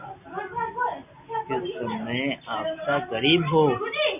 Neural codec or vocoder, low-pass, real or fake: none; 3.6 kHz; real